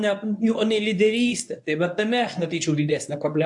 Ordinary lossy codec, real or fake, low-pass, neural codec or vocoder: AAC, 64 kbps; fake; 10.8 kHz; codec, 24 kHz, 0.9 kbps, WavTokenizer, medium speech release version 1